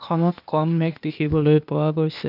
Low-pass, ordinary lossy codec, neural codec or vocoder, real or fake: 5.4 kHz; none; codec, 16 kHz, 0.8 kbps, ZipCodec; fake